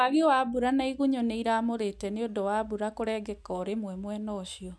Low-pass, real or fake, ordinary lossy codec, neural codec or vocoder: none; real; none; none